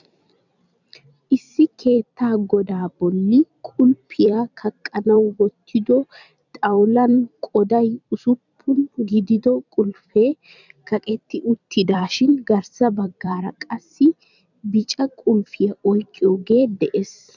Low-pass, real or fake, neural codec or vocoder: 7.2 kHz; fake; vocoder, 44.1 kHz, 128 mel bands every 256 samples, BigVGAN v2